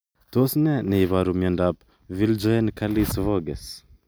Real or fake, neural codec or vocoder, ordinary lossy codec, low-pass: real; none; none; none